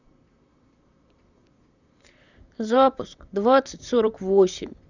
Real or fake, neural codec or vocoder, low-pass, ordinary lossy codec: fake; vocoder, 44.1 kHz, 128 mel bands, Pupu-Vocoder; 7.2 kHz; none